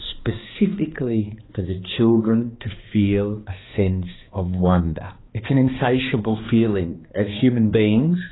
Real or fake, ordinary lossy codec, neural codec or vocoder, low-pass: fake; AAC, 16 kbps; codec, 16 kHz, 2 kbps, X-Codec, HuBERT features, trained on balanced general audio; 7.2 kHz